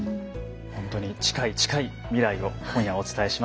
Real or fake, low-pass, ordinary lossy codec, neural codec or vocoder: real; none; none; none